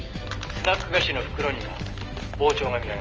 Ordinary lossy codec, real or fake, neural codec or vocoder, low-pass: Opus, 24 kbps; real; none; 7.2 kHz